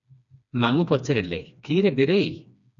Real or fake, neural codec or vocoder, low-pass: fake; codec, 16 kHz, 2 kbps, FreqCodec, smaller model; 7.2 kHz